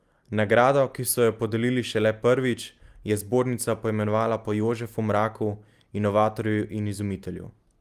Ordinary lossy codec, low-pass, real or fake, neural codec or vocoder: Opus, 32 kbps; 14.4 kHz; real; none